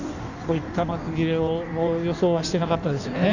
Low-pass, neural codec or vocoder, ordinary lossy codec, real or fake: 7.2 kHz; codec, 16 kHz in and 24 kHz out, 1.1 kbps, FireRedTTS-2 codec; none; fake